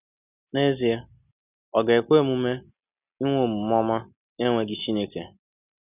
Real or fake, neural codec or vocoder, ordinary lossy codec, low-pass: real; none; none; 3.6 kHz